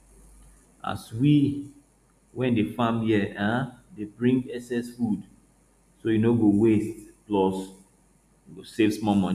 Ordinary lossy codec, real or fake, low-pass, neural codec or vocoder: none; real; 14.4 kHz; none